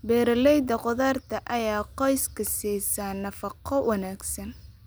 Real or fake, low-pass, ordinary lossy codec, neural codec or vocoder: real; none; none; none